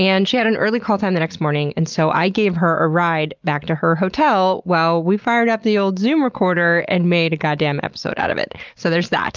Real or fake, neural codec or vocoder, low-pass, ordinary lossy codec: real; none; 7.2 kHz; Opus, 24 kbps